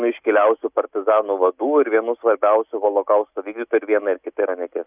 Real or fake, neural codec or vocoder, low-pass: real; none; 3.6 kHz